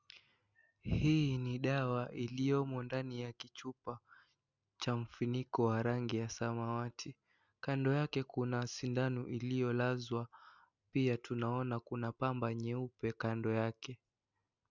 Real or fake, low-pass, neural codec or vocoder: real; 7.2 kHz; none